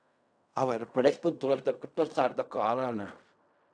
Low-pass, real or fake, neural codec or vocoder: 9.9 kHz; fake; codec, 16 kHz in and 24 kHz out, 0.4 kbps, LongCat-Audio-Codec, fine tuned four codebook decoder